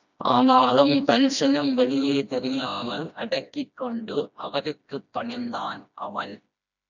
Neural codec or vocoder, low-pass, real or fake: codec, 16 kHz, 1 kbps, FreqCodec, smaller model; 7.2 kHz; fake